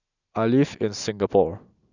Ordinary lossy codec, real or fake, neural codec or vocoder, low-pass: none; real; none; 7.2 kHz